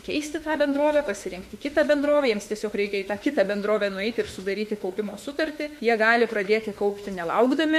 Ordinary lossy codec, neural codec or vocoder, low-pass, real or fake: MP3, 64 kbps; autoencoder, 48 kHz, 32 numbers a frame, DAC-VAE, trained on Japanese speech; 14.4 kHz; fake